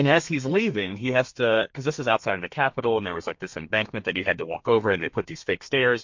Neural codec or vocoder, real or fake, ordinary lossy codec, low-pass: codec, 32 kHz, 1.9 kbps, SNAC; fake; MP3, 48 kbps; 7.2 kHz